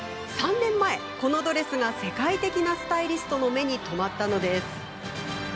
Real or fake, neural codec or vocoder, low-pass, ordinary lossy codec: real; none; none; none